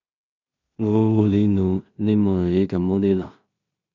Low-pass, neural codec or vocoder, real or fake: 7.2 kHz; codec, 16 kHz in and 24 kHz out, 0.4 kbps, LongCat-Audio-Codec, two codebook decoder; fake